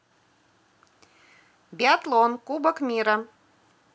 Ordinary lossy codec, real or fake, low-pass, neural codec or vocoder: none; real; none; none